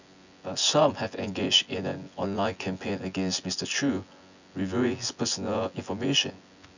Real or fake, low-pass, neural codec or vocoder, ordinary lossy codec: fake; 7.2 kHz; vocoder, 24 kHz, 100 mel bands, Vocos; none